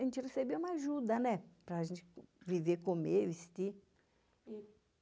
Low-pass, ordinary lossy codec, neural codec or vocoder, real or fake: none; none; none; real